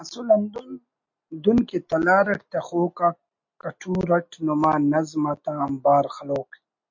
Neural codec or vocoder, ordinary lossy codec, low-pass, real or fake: none; MP3, 48 kbps; 7.2 kHz; real